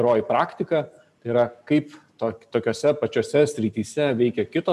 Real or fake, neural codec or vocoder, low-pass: real; none; 14.4 kHz